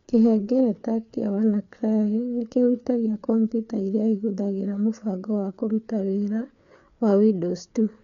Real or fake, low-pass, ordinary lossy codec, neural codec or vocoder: fake; 7.2 kHz; none; codec, 16 kHz, 4 kbps, FreqCodec, larger model